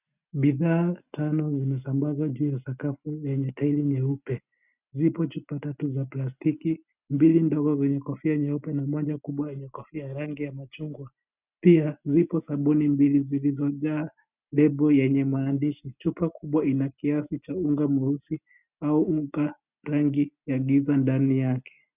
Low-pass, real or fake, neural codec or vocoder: 3.6 kHz; real; none